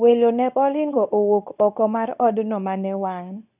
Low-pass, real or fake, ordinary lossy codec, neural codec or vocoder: 3.6 kHz; fake; none; codec, 24 kHz, 0.9 kbps, WavTokenizer, medium speech release version 2